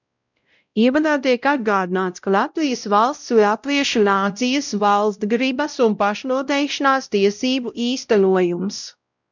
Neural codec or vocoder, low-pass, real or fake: codec, 16 kHz, 0.5 kbps, X-Codec, WavLM features, trained on Multilingual LibriSpeech; 7.2 kHz; fake